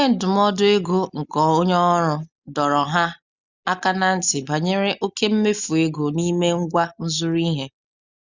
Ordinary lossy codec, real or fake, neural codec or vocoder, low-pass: Opus, 64 kbps; real; none; 7.2 kHz